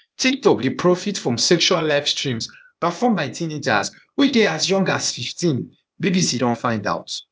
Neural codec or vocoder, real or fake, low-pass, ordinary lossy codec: codec, 16 kHz, 0.8 kbps, ZipCodec; fake; none; none